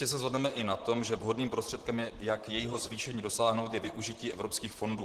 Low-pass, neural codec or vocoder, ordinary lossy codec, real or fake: 14.4 kHz; vocoder, 44.1 kHz, 128 mel bands, Pupu-Vocoder; Opus, 16 kbps; fake